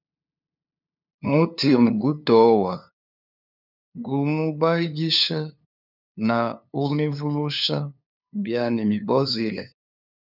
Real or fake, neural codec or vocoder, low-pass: fake; codec, 16 kHz, 2 kbps, FunCodec, trained on LibriTTS, 25 frames a second; 5.4 kHz